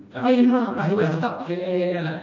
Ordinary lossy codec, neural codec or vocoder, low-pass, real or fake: none; codec, 16 kHz, 1 kbps, FreqCodec, smaller model; 7.2 kHz; fake